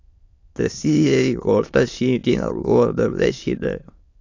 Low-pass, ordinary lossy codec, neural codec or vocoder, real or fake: 7.2 kHz; AAC, 48 kbps; autoencoder, 22.05 kHz, a latent of 192 numbers a frame, VITS, trained on many speakers; fake